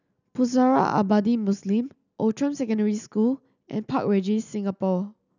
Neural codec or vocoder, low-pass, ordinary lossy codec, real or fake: none; 7.2 kHz; none; real